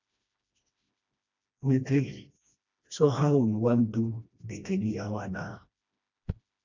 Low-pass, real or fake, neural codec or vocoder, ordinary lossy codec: 7.2 kHz; fake; codec, 16 kHz, 1 kbps, FreqCodec, smaller model; MP3, 64 kbps